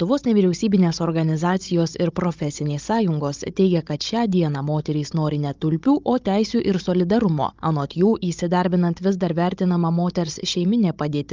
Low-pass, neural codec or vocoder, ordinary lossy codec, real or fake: 7.2 kHz; codec, 16 kHz, 16 kbps, FunCodec, trained on Chinese and English, 50 frames a second; Opus, 32 kbps; fake